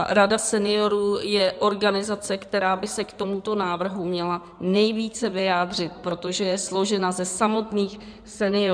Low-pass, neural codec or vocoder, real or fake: 9.9 kHz; codec, 16 kHz in and 24 kHz out, 2.2 kbps, FireRedTTS-2 codec; fake